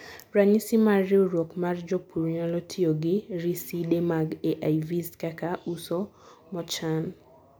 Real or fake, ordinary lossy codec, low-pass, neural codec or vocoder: real; none; none; none